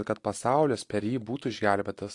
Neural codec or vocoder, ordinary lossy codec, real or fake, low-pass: none; AAC, 48 kbps; real; 10.8 kHz